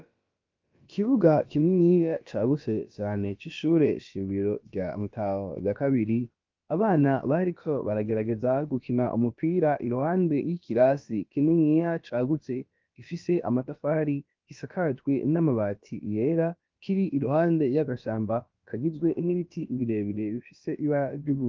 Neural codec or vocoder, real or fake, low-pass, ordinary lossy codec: codec, 16 kHz, about 1 kbps, DyCAST, with the encoder's durations; fake; 7.2 kHz; Opus, 24 kbps